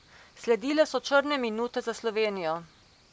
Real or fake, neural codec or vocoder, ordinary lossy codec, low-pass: real; none; none; none